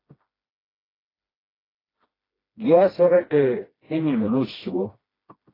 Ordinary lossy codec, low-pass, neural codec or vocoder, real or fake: AAC, 24 kbps; 5.4 kHz; codec, 16 kHz, 1 kbps, FreqCodec, smaller model; fake